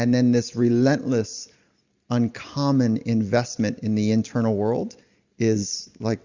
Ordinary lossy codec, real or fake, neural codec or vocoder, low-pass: Opus, 64 kbps; real; none; 7.2 kHz